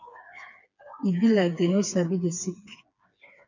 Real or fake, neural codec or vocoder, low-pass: fake; codec, 16 kHz, 4 kbps, FreqCodec, smaller model; 7.2 kHz